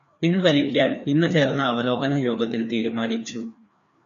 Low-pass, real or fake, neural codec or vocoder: 7.2 kHz; fake; codec, 16 kHz, 2 kbps, FreqCodec, larger model